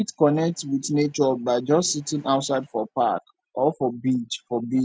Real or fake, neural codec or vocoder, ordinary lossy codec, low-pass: real; none; none; none